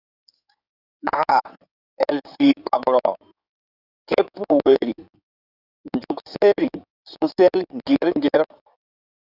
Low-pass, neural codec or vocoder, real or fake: 5.4 kHz; codec, 16 kHz in and 24 kHz out, 2.2 kbps, FireRedTTS-2 codec; fake